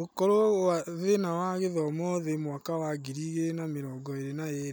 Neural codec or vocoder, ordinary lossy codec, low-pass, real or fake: none; none; none; real